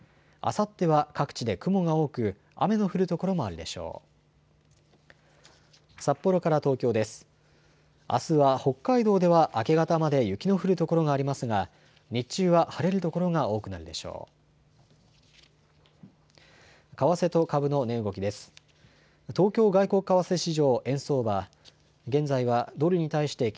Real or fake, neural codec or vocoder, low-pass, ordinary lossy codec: real; none; none; none